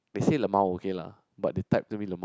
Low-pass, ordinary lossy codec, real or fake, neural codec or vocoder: none; none; real; none